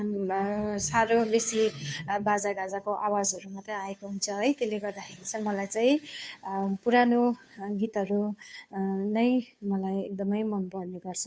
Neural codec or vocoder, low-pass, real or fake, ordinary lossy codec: codec, 16 kHz, 2 kbps, FunCodec, trained on Chinese and English, 25 frames a second; none; fake; none